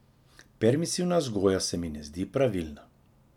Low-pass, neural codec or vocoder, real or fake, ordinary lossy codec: 19.8 kHz; none; real; none